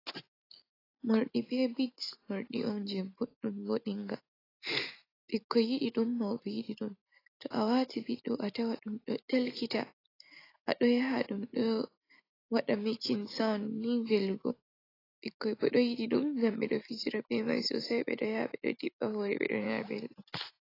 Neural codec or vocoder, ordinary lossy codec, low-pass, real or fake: none; AAC, 24 kbps; 5.4 kHz; real